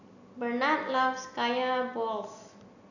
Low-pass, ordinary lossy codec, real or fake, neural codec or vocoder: 7.2 kHz; none; real; none